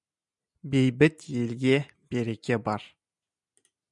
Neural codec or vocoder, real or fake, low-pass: none; real; 10.8 kHz